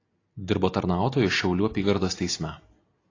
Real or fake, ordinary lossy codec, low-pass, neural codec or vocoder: real; AAC, 32 kbps; 7.2 kHz; none